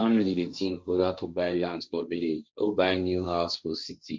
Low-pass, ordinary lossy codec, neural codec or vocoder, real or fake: none; none; codec, 16 kHz, 1.1 kbps, Voila-Tokenizer; fake